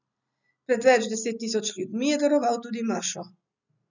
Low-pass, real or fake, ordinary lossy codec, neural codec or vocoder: 7.2 kHz; real; none; none